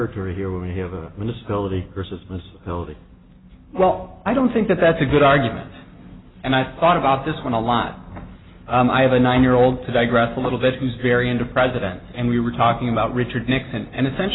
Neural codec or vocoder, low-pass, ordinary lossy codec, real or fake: none; 7.2 kHz; AAC, 16 kbps; real